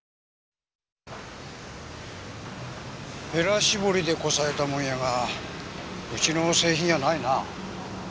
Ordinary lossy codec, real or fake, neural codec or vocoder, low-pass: none; real; none; none